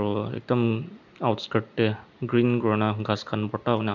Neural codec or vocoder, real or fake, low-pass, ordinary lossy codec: none; real; 7.2 kHz; none